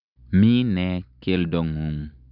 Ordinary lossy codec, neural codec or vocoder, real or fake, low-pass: none; none; real; 5.4 kHz